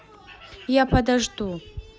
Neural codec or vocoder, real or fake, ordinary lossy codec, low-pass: none; real; none; none